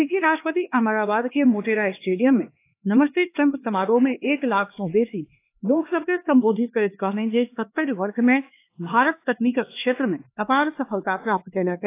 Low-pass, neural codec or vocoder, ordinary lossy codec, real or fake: 3.6 kHz; codec, 16 kHz, 2 kbps, X-Codec, HuBERT features, trained on LibriSpeech; AAC, 24 kbps; fake